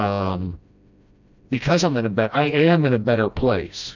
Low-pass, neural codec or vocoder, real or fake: 7.2 kHz; codec, 16 kHz, 1 kbps, FreqCodec, smaller model; fake